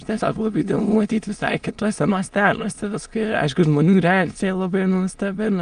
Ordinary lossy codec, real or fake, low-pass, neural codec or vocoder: Opus, 32 kbps; fake; 9.9 kHz; autoencoder, 22.05 kHz, a latent of 192 numbers a frame, VITS, trained on many speakers